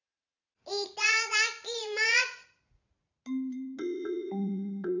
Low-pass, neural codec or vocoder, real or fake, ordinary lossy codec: 7.2 kHz; vocoder, 44.1 kHz, 80 mel bands, Vocos; fake; none